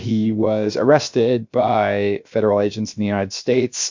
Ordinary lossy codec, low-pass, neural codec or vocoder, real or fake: MP3, 48 kbps; 7.2 kHz; codec, 16 kHz, 0.7 kbps, FocalCodec; fake